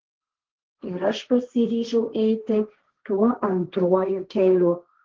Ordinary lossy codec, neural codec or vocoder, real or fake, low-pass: Opus, 16 kbps; codec, 16 kHz, 1.1 kbps, Voila-Tokenizer; fake; 7.2 kHz